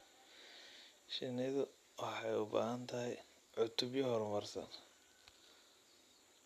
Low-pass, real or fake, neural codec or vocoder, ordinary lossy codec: 14.4 kHz; real; none; none